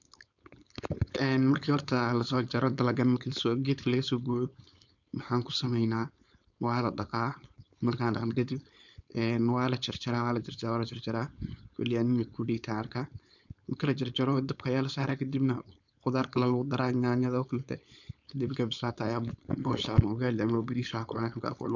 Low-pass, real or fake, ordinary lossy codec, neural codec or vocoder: 7.2 kHz; fake; none; codec, 16 kHz, 4.8 kbps, FACodec